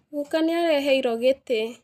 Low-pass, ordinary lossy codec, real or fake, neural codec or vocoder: 14.4 kHz; none; real; none